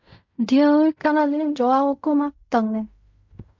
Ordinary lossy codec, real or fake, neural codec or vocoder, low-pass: MP3, 48 kbps; fake; codec, 16 kHz in and 24 kHz out, 0.4 kbps, LongCat-Audio-Codec, fine tuned four codebook decoder; 7.2 kHz